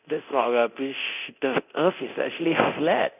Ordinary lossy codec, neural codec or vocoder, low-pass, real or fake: none; codec, 24 kHz, 0.9 kbps, DualCodec; 3.6 kHz; fake